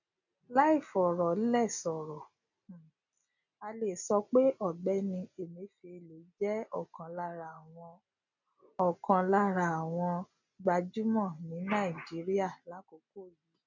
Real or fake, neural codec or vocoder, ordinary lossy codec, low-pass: real; none; none; 7.2 kHz